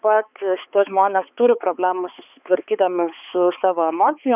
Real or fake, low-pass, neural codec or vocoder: fake; 3.6 kHz; codec, 16 kHz, 4 kbps, X-Codec, HuBERT features, trained on balanced general audio